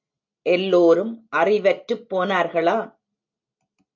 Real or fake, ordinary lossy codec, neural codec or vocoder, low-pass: real; AAC, 48 kbps; none; 7.2 kHz